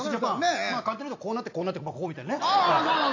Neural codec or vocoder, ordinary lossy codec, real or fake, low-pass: none; AAC, 48 kbps; real; 7.2 kHz